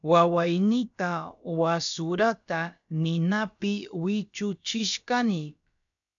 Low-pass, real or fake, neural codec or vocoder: 7.2 kHz; fake; codec, 16 kHz, about 1 kbps, DyCAST, with the encoder's durations